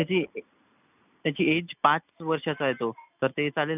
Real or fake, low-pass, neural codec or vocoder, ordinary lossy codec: real; 3.6 kHz; none; none